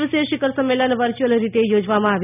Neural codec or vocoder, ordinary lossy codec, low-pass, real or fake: none; none; 3.6 kHz; real